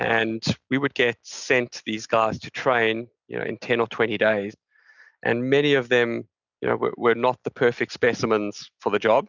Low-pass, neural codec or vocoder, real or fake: 7.2 kHz; none; real